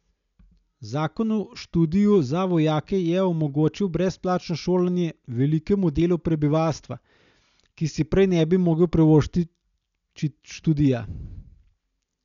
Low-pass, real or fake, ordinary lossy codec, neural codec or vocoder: 7.2 kHz; real; none; none